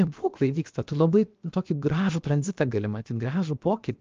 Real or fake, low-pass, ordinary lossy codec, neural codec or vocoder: fake; 7.2 kHz; Opus, 24 kbps; codec, 16 kHz, 0.7 kbps, FocalCodec